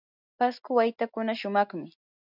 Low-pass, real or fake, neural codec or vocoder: 5.4 kHz; real; none